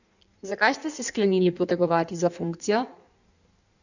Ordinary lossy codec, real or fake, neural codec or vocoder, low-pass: none; fake; codec, 16 kHz in and 24 kHz out, 1.1 kbps, FireRedTTS-2 codec; 7.2 kHz